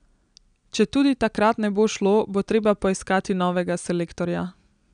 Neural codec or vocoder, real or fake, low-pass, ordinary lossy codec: none; real; 9.9 kHz; none